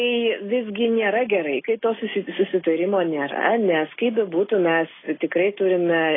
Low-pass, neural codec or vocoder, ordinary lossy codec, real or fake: 7.2 kHz; none; AAC, 16 kbps; real